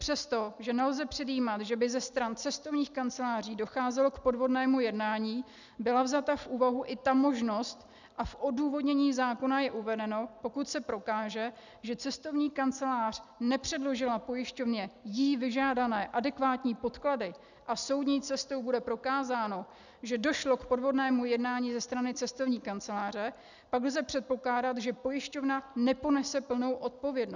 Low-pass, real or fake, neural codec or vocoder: 7.2 kHz; real; none